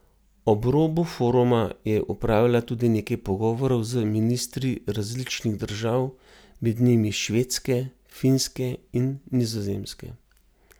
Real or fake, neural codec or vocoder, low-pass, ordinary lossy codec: real; none; none; none